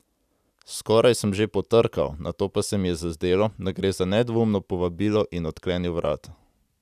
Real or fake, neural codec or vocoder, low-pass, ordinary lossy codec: real; none; 14.4 kHz; none